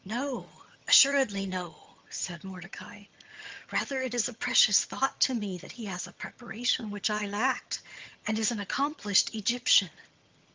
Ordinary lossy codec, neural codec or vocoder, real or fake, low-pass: Opus, 32 kbps; vocoder, 22.05 kHz, 80 mel bands, HiFi-GAN; fake; 7.2 kHz